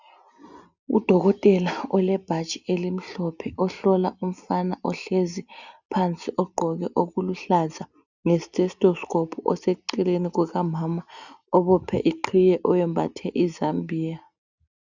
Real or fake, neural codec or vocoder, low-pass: real; none; 7.2 kHz